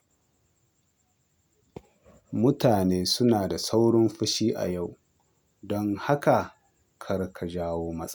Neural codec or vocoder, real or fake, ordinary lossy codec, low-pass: none; real; none; none